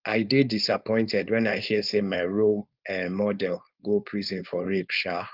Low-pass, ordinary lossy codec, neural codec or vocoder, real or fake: 5.4 kHz; Opus, 24 kbps; codec, 16 kHz, 4.8 kbps, FACodec; fake